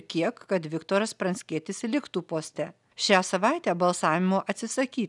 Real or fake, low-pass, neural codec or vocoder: real; 10.8 kHz; none